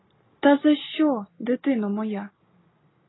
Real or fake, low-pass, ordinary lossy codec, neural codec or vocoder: real; 7.2 kHz; AAC, 16 kbps; none